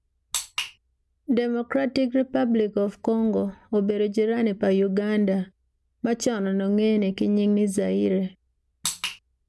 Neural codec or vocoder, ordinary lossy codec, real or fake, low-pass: none; none; real; none